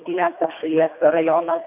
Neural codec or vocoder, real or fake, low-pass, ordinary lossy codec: codec, 24 kHz, 1.5 kbps, HILCodec; fake; 3.6 kHz; MP3, 32 kbps